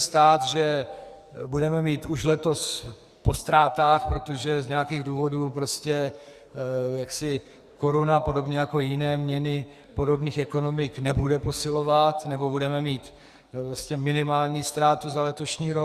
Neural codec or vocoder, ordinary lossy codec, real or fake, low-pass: codec, 44.1 kHz, 2.6 kbps, SNAC; Opus, 64 kbps; fake; 14.4 kHz